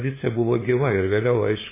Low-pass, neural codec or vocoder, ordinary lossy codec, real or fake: 3.6 kHz; codec, 16 kHz, 8 kbps, FunCodec, trained on LibriTTS, 25 frames a second; MP3, 16 kbps; fake